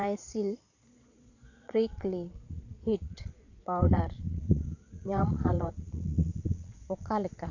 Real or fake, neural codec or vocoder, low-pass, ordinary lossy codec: fake; vocoder, 22.05 kHz, 80 mel bands, Vocos; 7.2 kHz; none